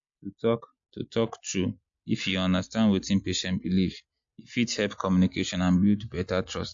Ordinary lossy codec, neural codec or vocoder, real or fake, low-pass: MP3, 48 kbps; none; real; 7.2 kHz